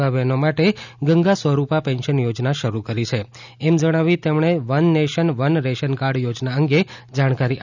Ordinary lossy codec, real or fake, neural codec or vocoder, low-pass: none; real; none; 7.2 kHz